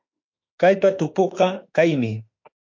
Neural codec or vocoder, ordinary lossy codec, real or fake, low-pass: autoencoder, 48 kHz, 32 numbers a frame, DAC-VAE, trained on Japanese speech; MP3, 48 kbps; fake; 7.2 kHz